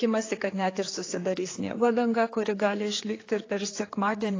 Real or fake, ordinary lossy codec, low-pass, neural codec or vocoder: fake; AAC, 32 kbps; 7.2 kHz; codec, 16 kHz, 4 kbps, X-Codec, HuBERT features, trained on general audio